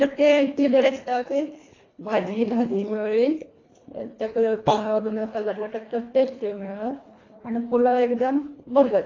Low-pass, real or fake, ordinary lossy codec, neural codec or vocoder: 7.2 kHz; fake; AAC, 32 kbps; codec, 24 kHz, 1.5 kbps, HILCodec